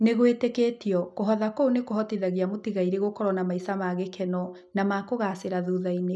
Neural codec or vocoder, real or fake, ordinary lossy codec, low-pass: none; real; none; none